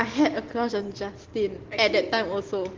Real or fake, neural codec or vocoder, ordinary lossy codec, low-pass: real; none; Opus, 16 kbps; 7.2 kHz